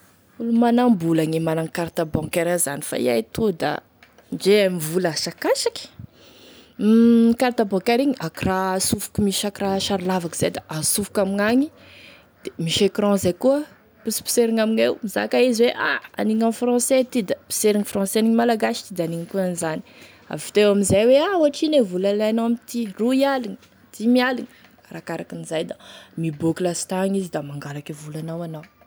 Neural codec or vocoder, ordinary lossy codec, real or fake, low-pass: none; none; real; none